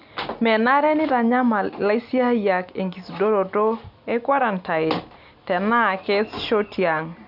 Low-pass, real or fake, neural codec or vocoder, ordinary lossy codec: 5.4 kHz; real; none; none